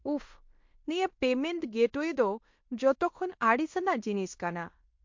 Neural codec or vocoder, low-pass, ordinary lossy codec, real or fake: codec, 16 kHz in and 24 kHz out, 0.9 kbps, LongCat-Audio-Codec, fine tuned four codebook decoder; 7.2 kHz; MP3, 48 kbps; fake